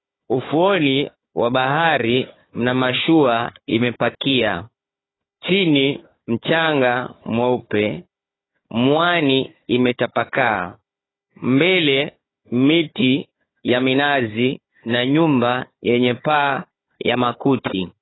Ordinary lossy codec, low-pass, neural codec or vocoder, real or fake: AAC, 16 kbps; 7.2 kHz; codec, 16 kHz, 4 kbps, FunCodec, trained on Chinese and English, 50 frames a second; fake